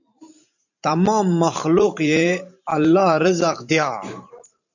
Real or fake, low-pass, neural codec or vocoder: fake; 7.2 kHz; vocoder, 44.1 kHz, 128 mel bands every 512 samples, BigVGAN v2